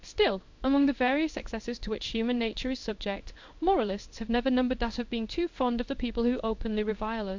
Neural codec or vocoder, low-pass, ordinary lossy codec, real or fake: codec, 16 kHz in and 24 kHz out, 1 kbps, XY-Tokenizer; 7.2 kHz; MP3, 64 kbps; fake